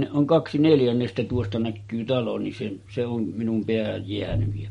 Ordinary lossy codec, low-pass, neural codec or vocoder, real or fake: MP3, 48 kbps; 19.8 kHz; none; real